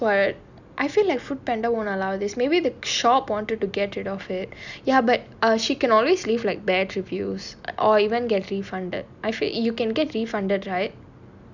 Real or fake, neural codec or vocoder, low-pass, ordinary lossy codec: real; none; 7.2 kHz; none